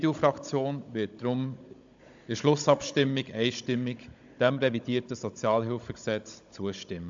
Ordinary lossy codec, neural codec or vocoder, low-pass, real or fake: AAC, 64 kbps; codec, 16 kHz, 16 kbps, FunCodec, trained on Chinese and English, 50 frames a second; 7.2 kHz; fake